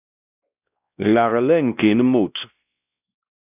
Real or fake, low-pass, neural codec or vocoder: fake; 3.6 kHz; codec, 16 kHz, 1 kbps, X-Codec, WavLM features, trained on Multilingual LibriSpeech